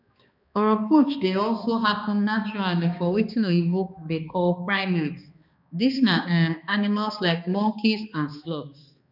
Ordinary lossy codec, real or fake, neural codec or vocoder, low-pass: none; fake; codec, 16 kHz, 2 kbps, X-Codec, HuBERT features, trained on balanced general audio; 5.4 kHz